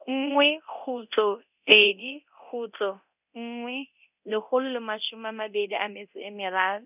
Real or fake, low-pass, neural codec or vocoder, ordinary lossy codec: fake; 3.6 kHz; codec, 24 kHz, 0.9 kbps, DualCodec; none